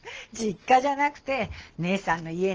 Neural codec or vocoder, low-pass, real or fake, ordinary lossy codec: none; 7.2 kHz; real; Opus, 16 kbps